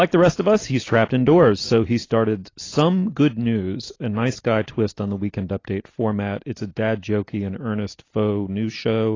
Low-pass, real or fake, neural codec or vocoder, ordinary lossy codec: 7.2 kHz; real; none; AAC, 32 kbps